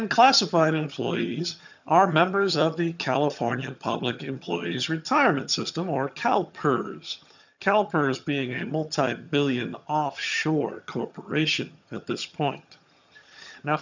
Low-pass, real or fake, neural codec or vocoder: 7.2 kHz; fake; vocoder, 22.05 kHz, 80 mel bands, HiFi-GAN